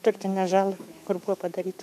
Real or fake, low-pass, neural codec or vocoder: fake; 14.4 kHz; autoencoder, 48 kHz, 128 numbers a frame, DAC-VAE, trained on Japanese speech